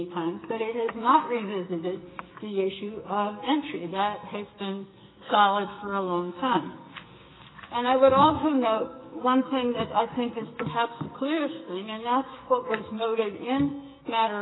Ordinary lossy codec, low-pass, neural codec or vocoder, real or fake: AAC, 16 kbps; 7.2 kHz; codec, 44.1 kHz, 2.6 kbps, SNAC; fake